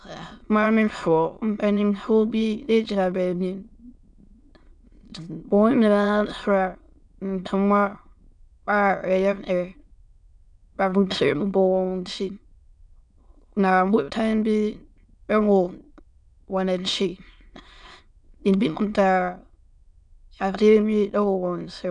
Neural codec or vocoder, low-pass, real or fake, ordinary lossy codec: autoencoder, 22.05 kHz, a latent of 192 numbers a frame, VITS, trained on many speakers; 9.9 kHz; fake; MP3, 96 kbps